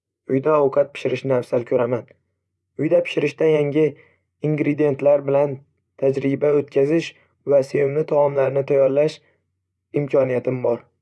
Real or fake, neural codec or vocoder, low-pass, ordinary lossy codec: fake; vocoder, 24 kHz, 100 mel bands, Vocos; none; none